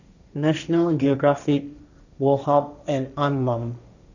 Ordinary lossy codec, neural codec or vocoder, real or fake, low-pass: none; codec, 16 kHz, 1.1 kbps, Voila-Tokenizer; fake; 7.2 kHz